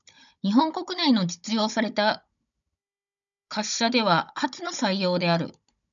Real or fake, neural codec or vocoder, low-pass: fake; codec, 16 kHz, 16 kbps, FunCodec, trained on Chinese and English, 50 frames a second; 7.2 kHz